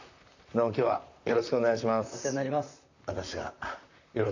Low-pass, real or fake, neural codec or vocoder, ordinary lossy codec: 7.2 kHz; fake; vocoder, 44.1 kHz, 128 mel bands, Pupu-Vocoder; none